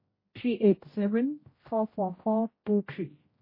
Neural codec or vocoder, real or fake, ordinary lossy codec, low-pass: codec, 16 kHz, 0.5 kbps, X-Codec, HuBERT features, trained on general audio; fake; MP3, 24 kbps; 5.4 kHz